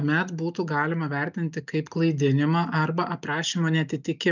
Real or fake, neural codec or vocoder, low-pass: real; none; 7.2 kHz